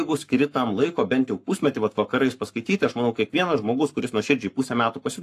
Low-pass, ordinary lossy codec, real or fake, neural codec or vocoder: 14.4 kHz; AAC, 64 kbps; fake; codec, 44.1 kHz, 7.8 kbps, Pupu-Codec